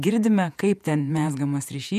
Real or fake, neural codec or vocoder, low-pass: fake; vocoder, 48 kHz, 128 mel bands, Vocos; 14.4 kHz